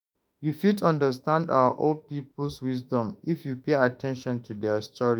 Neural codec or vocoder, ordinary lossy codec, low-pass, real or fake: autoencoder, 48 kHz, 32 numbers a frame, DAC-VAE, trained on Japanese speech; none; none; fake